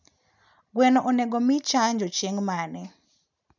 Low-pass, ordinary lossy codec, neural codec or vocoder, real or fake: 7.2 kHz; none; none; real